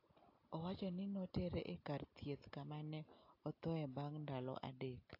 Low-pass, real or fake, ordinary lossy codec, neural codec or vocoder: 5.4 kHz; real; AAC, 48 kbps; none